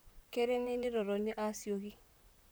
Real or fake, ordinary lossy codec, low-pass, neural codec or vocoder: fake; none; none; vocoder, 44.1 kHz, 128 mel bands, Pupu-Vocoder